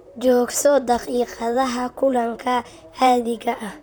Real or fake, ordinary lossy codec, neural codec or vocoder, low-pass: fake; none; vocoder, 44.1 kHz, 128 mel bands, Pupu-Vocoder; none